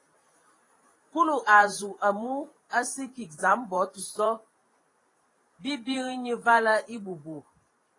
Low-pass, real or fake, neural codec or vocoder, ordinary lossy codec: 10.8 kHz; fake; vocoder, 44.1 kHz, 128 mel bands every 512 samples, BigVGAN v2; AAC, 32 kbps